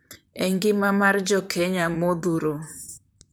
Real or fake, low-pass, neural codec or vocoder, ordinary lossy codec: fake; none; vocoder, 44.1 kHz, 128 mel bands, Pupu-Vocoder; none